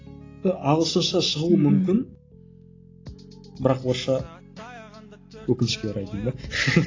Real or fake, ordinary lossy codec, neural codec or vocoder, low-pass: real; AAC, 32 kbps; none; 7.2 kHz